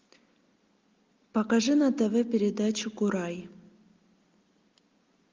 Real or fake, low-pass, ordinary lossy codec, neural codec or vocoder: real; 7.2 kHz; Opus, 32 kbps; none